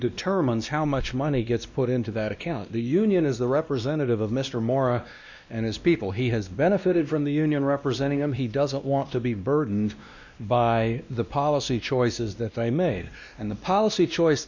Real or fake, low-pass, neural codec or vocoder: fake; 7.2 kHz; codec, 16 kHz, 1 kbps, X-Codec, WavLM features, trained on Multilingual LibriSpeech